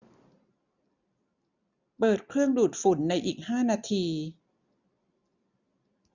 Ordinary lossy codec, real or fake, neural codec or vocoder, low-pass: none; real; none; 7.2 kHz